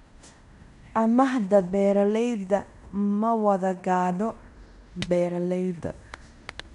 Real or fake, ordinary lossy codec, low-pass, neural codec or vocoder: fake; none; 10.8 kHz; codec, 16 kHz in and 24 kHz out, 0.9 kbps, LongCat-Audio-Codec, fine tuned four codebook decoder